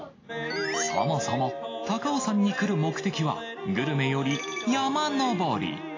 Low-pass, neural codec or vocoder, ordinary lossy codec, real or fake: 7.2 kHz; none; AAC, 32 kbps; real